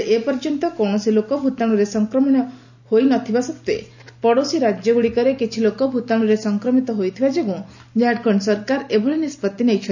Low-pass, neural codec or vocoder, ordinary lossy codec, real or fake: 7.2 kHz; none; none; real